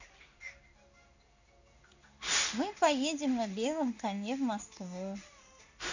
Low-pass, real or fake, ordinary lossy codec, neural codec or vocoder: 7.2 kHz; fake; none; codec, 16 kHz in and 24 kHz out, 1 kbps, XY-Tokenizer